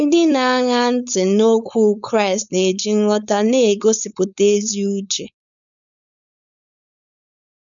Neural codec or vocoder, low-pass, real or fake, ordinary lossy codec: codec, 16 kHz, 4.8 kbps, FACodec; 7.2 kHz; fake; none